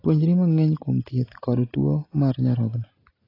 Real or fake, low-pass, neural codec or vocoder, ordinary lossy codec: real; 5.4 kHz; none; AAC, 24 kbps